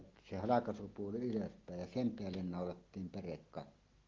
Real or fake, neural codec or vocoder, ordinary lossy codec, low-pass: real; none; Opus, 16 kbps; 7.2 kHz